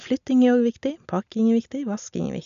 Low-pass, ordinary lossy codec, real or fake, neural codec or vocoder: 7.2 kHz; none; real; none